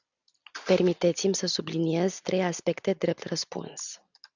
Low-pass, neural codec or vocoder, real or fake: 7.2 kHz; none; real